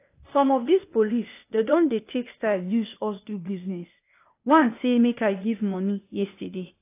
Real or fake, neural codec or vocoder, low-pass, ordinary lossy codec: fake; codec, 16 kHz, 0.8 kbps, ZipCodec; 3.6 kHz; AAC, 24 kbps